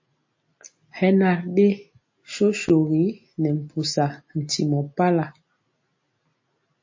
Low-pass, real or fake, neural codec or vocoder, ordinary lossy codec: 7.2 kHz; real; none; MP3, 32 kbps